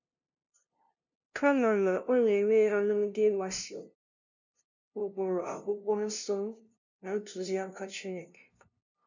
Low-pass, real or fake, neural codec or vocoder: 7.2 kHz; fake; codec, 16 kHz, 0.5 kbps, FunCodec, trained on LibriTTS, 25 frames a second